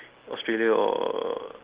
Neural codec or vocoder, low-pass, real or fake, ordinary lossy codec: none; 3.6 kHz; real; Opus, 16 kbps